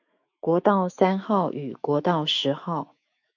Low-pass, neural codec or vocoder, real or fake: 7.2 kHz; autoencoder, 48 kHz, 128 numbers a frame, DAC-VAE, trained on Japanese speech; fake